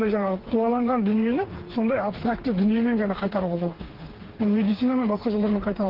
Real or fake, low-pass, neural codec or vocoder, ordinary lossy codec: fake; 5.4 kHz; codec, 16 kHz, 4 kbps, FreqCodec, smaller model; Opus, 16 kbps